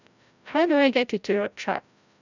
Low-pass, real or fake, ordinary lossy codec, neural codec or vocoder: 7.2 kHz; fake; none; codec, 16 kHz, 0.5 kbps, FreqCodec, larger model